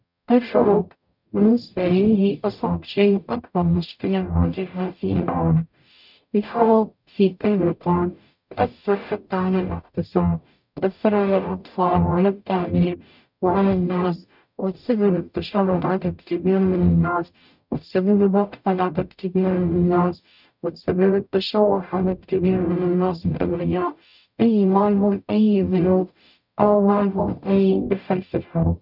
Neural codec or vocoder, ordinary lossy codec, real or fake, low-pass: codec, 44.1 kHz, 0.9 kbps, DAC; none; fake; 5.4 kHz